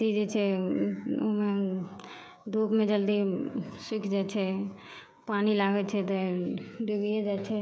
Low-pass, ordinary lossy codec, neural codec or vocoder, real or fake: none; none; codec, 16 kHz, 16 kbps, FreqCodec, smaller model; fake